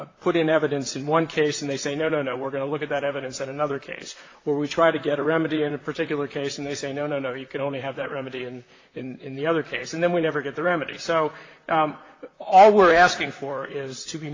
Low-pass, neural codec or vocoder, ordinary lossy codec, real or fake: 7.2 kHz; vocoder, 44.1 kHz, 128 mel bands, Pupu-Vocoder; AAC, 32 kbps; fake